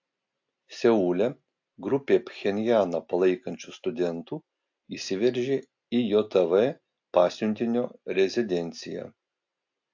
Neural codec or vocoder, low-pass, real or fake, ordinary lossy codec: none; 7.2 kHz; real; AAC, 48 kbps